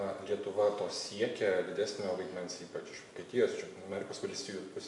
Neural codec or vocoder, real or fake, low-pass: none; real; 10.8 kHz